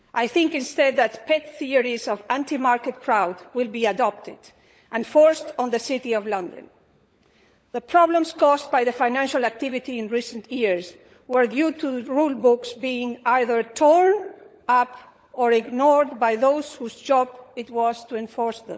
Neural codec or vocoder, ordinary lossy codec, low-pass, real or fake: codec, 16 kHz, 16 kbps, FunCodec, trained on LibriTTS, 50 frames a second; none; none; fake